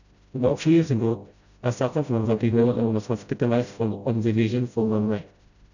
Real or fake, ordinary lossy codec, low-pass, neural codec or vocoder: fake; none; 7.2 kHz; codec, 16 kHz, 0.5 kbps, FreqCodec, smaller model